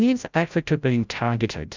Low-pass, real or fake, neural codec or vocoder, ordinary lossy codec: 7.2 kHz; fake; codec, 16 kHz, 0.5 kbps, FreqCodec, larger model; Opus, 64 kbps